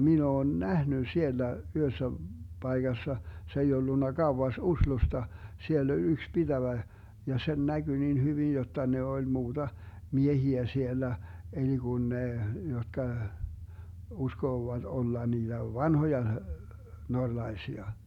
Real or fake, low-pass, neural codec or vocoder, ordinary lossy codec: real; 19.8 kHz; none; none